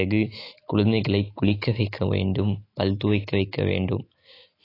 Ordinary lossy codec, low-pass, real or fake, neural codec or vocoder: AAC, 24 kbps; 5.4 kHz; fake; autoencoder, 48 kHz, 128 numbers a frame, DAC-VAE, trained on Japanese speech